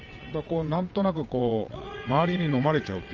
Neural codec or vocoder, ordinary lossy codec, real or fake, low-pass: vocoder, 22.05 kHz, 80 mel bands, WaveNeXt; Opus, 24 kbps; fake; 7.2 kHz